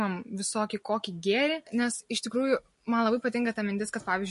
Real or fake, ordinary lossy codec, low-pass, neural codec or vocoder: real; MP3, 48 kbps; 14.4 kHz; none